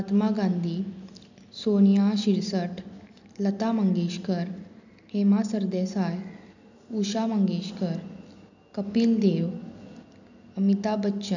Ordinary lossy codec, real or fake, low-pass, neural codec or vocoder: none; real; 7.2 kHz; none